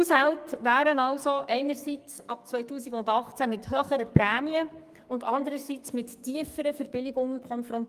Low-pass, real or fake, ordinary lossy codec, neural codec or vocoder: 14.4 kHz; fake; Opus, 32 kbps; codec, 44.1 kHz, 2.6 kbps, SNAC